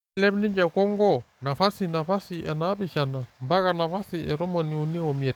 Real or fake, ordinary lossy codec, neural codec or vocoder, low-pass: fake; none; codec, 44.1 kHz, 7.8 kbps, DAC; 19.8 kHz